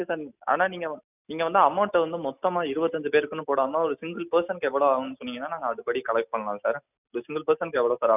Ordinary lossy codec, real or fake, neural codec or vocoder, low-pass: none; real; none; 3.6 kHz